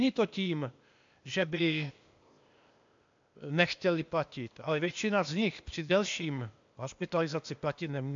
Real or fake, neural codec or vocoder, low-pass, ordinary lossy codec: fake; codec, 16 kHz, 0.8 kbps, ZipCodec; 7.2 kHz; AAC, 64 kbps